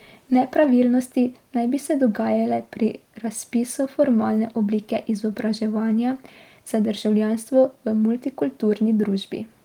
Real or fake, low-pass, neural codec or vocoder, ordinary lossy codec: real; 19.8 kHz; none; Opus, 24 kbps